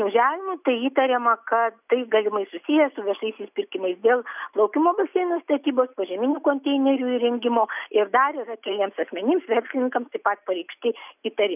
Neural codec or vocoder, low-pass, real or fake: none; 3.6 kHz; real